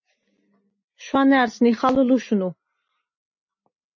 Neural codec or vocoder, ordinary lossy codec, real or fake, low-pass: none; MP3, 32 kbps; real; 7.2 kHz